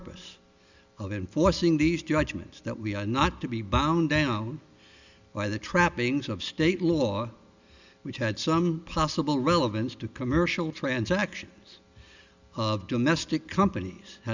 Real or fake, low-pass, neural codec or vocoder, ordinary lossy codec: real; 7.2 kHz; none; Opus, 64 kbps